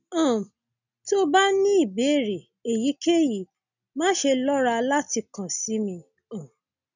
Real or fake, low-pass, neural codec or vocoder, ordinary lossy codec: real; 7.2 kHz; none; none